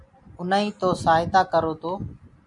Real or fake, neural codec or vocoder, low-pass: real; none; 10.8 kHz